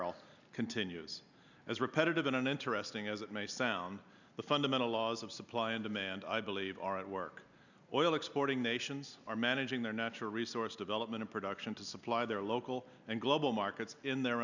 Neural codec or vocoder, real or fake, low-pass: none; real; 7.2 kHz